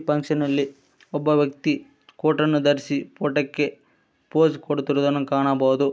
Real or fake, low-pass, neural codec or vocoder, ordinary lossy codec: real; none; none; none